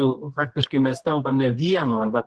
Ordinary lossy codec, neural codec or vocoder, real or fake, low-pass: Opus, 16 kbps; codec, 24 kHz, 0.9 kbps, WavTokenizer, medium music audio release; fake; 10.8 kHz